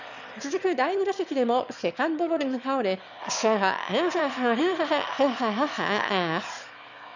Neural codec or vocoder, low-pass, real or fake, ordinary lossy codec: autoencoder, 22.05 kHz, a latent of 192 numbers a frame, VITS, trained on one speaker; 7.2 kHz; fake; none